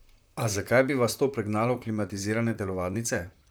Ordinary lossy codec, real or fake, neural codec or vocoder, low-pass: none; fake; vocoder, 44.1 kHz, 128 mel bands, Pupu-Vocoder; none